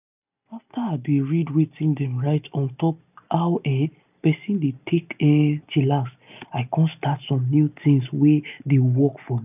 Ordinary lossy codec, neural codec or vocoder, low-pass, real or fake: none; none; 3.6 kHz; real